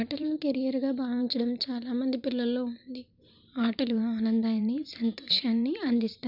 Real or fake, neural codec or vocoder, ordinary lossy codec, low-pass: fake; vocoder, 44.1 kHz, 128 mel bands every 512 samples, BigVGAN v2; none; 5.4 kHz